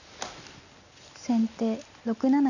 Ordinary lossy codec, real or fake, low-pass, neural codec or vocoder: none; real; 7.2 kHz; none